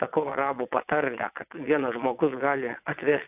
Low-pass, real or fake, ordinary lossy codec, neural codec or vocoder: 3.6 kHz; fake; AAC, 32 kbps; vocoder, 22.05 kHz, 80 mel bands, WaveNeXt